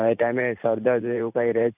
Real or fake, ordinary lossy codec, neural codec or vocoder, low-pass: fake; none; vocoder, 44.1 kHz, 128 mel bands every 512 samples, BigVGAN v2; 3.6 kHz